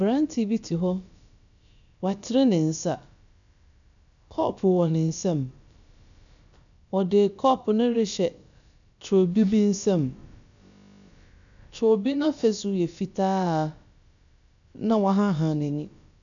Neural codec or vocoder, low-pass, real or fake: codec, 16 kHz, about 1 kbps, DyCAST, with the encoder's durations; 7.2 kHz; fake